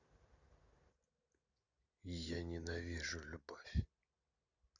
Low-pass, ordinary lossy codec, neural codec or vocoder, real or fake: 7.2 kHz; none; none; real